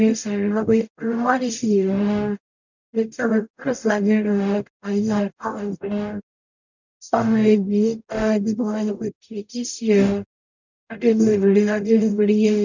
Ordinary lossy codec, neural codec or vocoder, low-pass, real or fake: none; codec, 44.1 kHz, 0.9 kbps, DAC; 7.2 kHz; fake